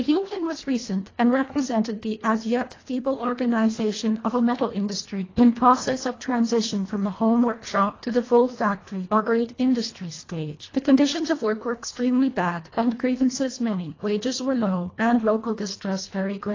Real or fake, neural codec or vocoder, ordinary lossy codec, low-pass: fake; codec, 24 kHz, 1.5 kbps, HILCodec; AAC, 32 kbps; 7.2 kHz